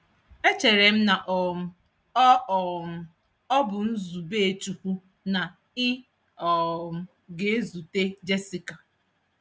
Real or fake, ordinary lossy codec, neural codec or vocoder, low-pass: real; none; none; none